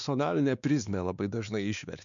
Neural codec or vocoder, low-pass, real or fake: codec, 16 kHz, 2 kbps, X-Codec, WavLM features, trained on Multilingual LibriSpeech; 7.2 kHz; fake